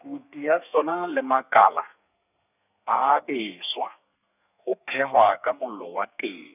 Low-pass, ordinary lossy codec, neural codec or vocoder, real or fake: 3.6 kHz; none; codec, 32 kHz, 1.9 kbps, SNAC; fake